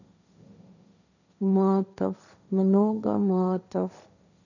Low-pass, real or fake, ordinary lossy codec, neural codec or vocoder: 7.2 kHz; fake; none; codec, 16 kHz, 1.1 kbps, Voila-Tokenizer